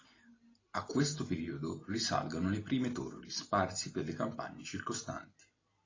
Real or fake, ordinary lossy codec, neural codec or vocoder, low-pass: real; AAC, 32 kbps; none; 7.2 kHz